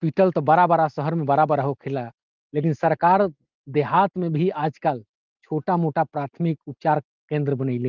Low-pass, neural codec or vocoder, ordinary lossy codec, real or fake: 7.2 kHz; none; Opus, 32 kbps; real